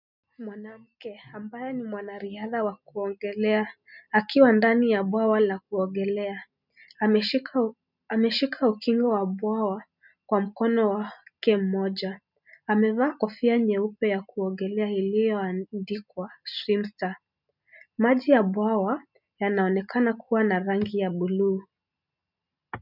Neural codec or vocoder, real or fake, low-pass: none; real; 5.4 kHz